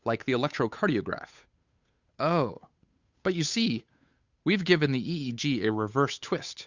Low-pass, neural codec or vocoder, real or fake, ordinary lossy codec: 7.2 kHz; codec, 16 kHz, 8 kbps, FunCodec, trained on Chinese and English, 25 frames a second; fake; Opus, 64 kbps